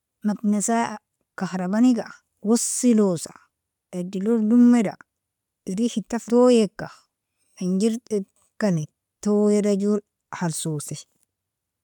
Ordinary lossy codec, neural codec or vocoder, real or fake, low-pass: none; none; real; 19.8 kHz